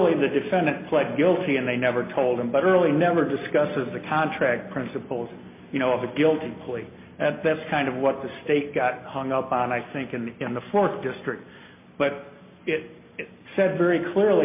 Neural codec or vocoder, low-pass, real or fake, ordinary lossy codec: none; 3.6 kHz; real; MP3, 24 kbps